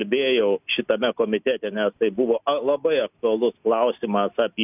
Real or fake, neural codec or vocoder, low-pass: real; none; 3.6 kHz